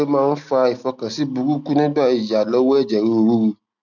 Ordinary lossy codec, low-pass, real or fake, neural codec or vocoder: none; 7.2 kHz; real; none